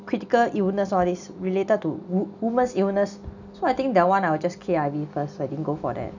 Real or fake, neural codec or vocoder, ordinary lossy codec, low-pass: real; none; none; 7.2 kHz